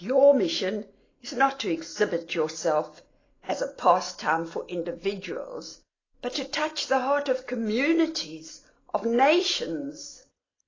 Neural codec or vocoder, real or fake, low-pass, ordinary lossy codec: none; real; 7.2 kHz; AAC, 32 kbps